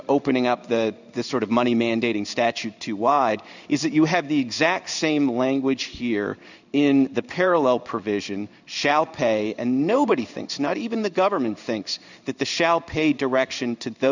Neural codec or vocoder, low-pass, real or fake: codec, 16 kHz in and 24 kHz out, 1 kbps, XY-Tokenizer; 7.2 kHz; fake